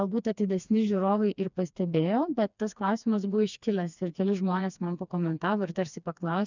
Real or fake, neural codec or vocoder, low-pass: fake; codec, 16 kHz, 2 kbps, FreqCodec, smaller model; 7.2 kHz